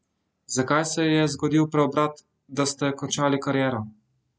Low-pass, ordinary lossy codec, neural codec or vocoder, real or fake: none; none; none; real